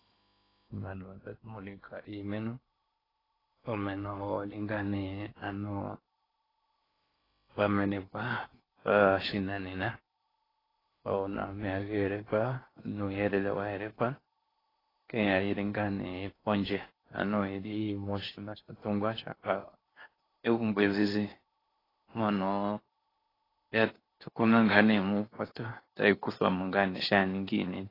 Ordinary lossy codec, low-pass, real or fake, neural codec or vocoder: AAC, 24 kbps; 5.4 kHz; fake; codec, 16 kHz in and 24 kHz out, 0.8 kbps, FocalCodec, streaming, 65536 codes